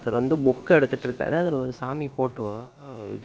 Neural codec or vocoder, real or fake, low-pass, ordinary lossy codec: codec, 16 kHz, about 1 kbps, DyCAST, with the encoder's durations; fake; none; none